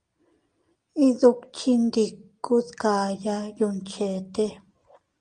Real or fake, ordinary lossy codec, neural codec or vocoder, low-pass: real; Opus, 32 kbps; none; 9.9 kHz